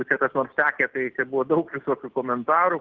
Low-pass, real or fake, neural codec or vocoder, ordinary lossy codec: 7.2 kHz; real; none; Opus, 16 kbps